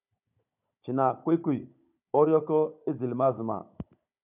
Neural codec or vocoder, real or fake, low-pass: codec, 16 kHz, 16 kbps, FunCodec, trained on Chinese and English, 50 frames a second; fake; 3.6 kHz